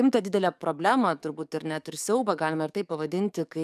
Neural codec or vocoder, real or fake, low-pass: codec, 44.1 kHz, 7.8 kbps, DAC; fake; 14.4 kHz